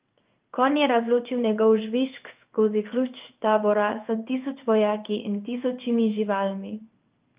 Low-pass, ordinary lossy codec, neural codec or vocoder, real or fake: 3.6 kHz; Opus, 24 kbps; codec, 16 kHz in and 24 kHz out, 1 kbps, XY-Tokenizer; fake